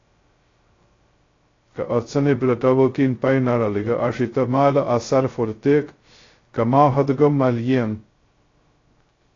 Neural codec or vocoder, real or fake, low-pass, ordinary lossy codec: codec, 16 kHz, 0.2 kbps, FocalCodec; fake; 7.2 kHz; AAC, 32 kbps